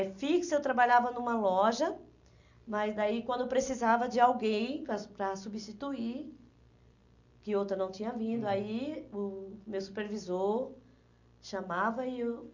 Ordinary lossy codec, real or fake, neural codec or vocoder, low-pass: none; real; none; 7.2 kHz